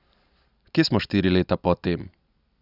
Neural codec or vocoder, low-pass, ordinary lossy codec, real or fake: none; 5.4 kHz; none; real